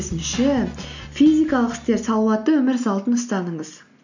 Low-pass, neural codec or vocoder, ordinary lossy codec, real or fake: 7.2 kHz; none; none; real